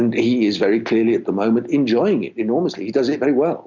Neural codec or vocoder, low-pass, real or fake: none; 7.2 kHz; real